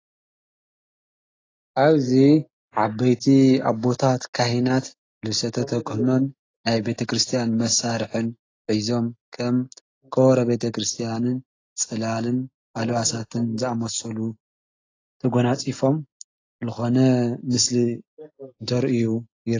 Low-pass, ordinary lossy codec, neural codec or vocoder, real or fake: 7.2 kHz; AAC, 32 kbps; none; real